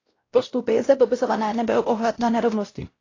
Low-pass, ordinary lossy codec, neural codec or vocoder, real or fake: 7.2 kHz; AAC, 32 kbps; codec, 16 kHz, 0.5 kbps, X-Codec, WavLM features, trained on Multilingual LibriSpeech; fake